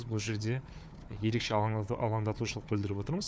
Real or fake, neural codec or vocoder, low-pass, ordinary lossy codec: fake; codec, 16 kHz, 4 kbps, FunCodec, trained on Chinese and English, 50 frames a second; none; none